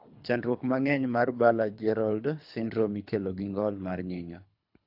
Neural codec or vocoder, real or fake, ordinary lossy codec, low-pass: codec, 24 kHz, 3 kbps, HILCodec; fake; AAC, 48 kbps; 5.4 kHz